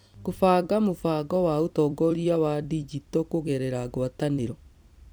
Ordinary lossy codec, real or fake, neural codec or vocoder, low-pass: none; fake; vocoder, 44.1 kHz, 128 mel bands every 256 samples, BigVGAN v2; none